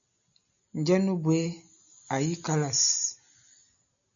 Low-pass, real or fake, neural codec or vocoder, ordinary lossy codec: 7.2 kHz; real; none; MP3, 96 kbps